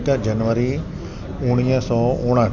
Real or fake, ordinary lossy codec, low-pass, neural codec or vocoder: real; none; 7.2 kHz; none